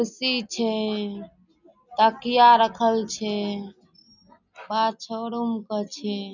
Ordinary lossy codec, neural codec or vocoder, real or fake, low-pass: none; none; real; 7.2 kHz